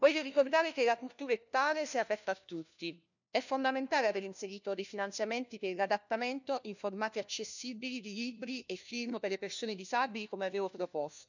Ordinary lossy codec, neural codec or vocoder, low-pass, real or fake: none; codec, 16 kHz, 1 kbps, FunCodec, trained on LibriTTS, 50 frames a second; 7.2 kHz; fake